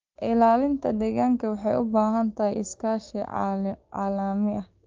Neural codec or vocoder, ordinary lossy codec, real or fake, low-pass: codec, 16 kHz, 6 kbps, DAC; Opus, 32 kbps; fake; 7.2 kHz